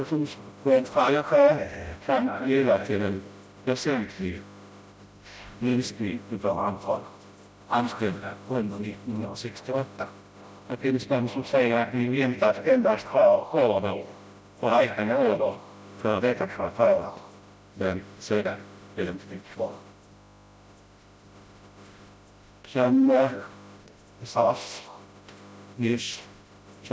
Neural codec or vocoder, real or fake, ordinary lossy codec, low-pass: codec, 16 kHz, 0.5 kbps, FreqCodec, smaller model; fake; none; none